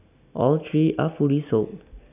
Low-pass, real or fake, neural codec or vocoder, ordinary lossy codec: 3.6 kHz; real; none; none